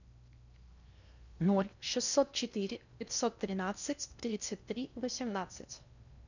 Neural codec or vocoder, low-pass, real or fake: codec, 16 kHz in and 24 kHz out, 0.8 kbps, FocalCodec, streaming, 65536 codes; 7.2 kHz; fake